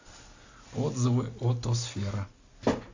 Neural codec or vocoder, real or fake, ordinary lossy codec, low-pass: none; real; AAC, 48 kbps; 7.2 kHz